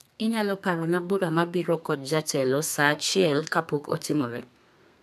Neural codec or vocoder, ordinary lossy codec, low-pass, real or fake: codec, 32 kHz, 1.9 kbps, SNAC; none; 14.4 kHz; fake